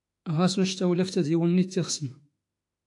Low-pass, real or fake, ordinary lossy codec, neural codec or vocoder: 10.8 kHz; fake; AAC, 64 kbps; autoencoder, 48 kHz, 32 numbers a frame, DAC-VAE, trained on Japanese speech